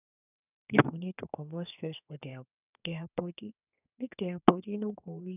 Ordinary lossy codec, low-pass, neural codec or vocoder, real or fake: none; 3.6 kHz; codec, 32 kHz, 1.9 kbps, SNAC; fake